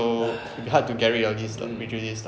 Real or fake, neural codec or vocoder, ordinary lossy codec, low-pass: real; none; none; none